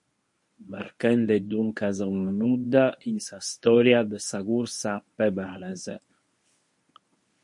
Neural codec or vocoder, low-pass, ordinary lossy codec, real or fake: codec, 24 kHz, 0.9 kbps, WavTokenizer, medium speech release version 1; 10.8 kHz; MP3, 48 kbps; fake